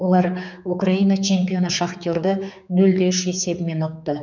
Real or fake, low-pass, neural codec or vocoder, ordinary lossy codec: fake; 7.2 kHz; codec, 16 kHz, 4 kbps, X-Codec, HuBERT features, trained on general audio; none